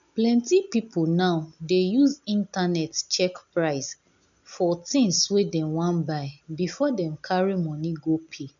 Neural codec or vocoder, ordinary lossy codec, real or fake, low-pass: none; MP3, 96 kbps; real; 7.2 kHz